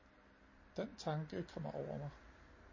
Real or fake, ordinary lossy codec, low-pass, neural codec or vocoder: real; MP3, 32 kbps; 7.2 kHz; none